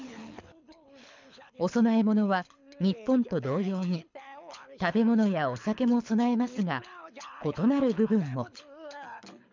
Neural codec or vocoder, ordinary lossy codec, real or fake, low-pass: codec, 24 kHz, 6 kbps, HILCodec; MP3, 64 kbps; fake; 7.2 kHz